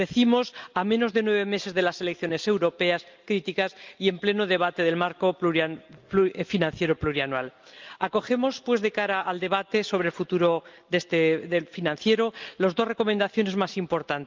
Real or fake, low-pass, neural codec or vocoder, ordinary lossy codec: real; 7.2 kHz; none; Opus, 32 kbps